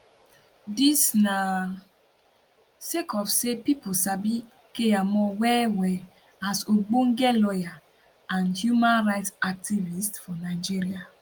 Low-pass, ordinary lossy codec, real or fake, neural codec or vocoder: 19.8 kHz; Opus, 32 kbps; real; none